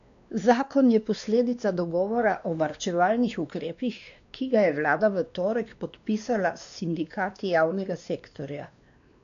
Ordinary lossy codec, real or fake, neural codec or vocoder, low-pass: none; fake; codec, 16 kHz, 2 kbps, X-Codec, WavLM features, trained on Multilingual LibriSpeech; 7.2 kHz